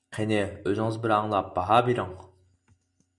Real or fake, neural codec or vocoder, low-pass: real; none; 10.8 kHz